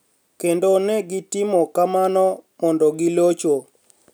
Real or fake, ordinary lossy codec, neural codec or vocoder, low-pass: real; none; none; none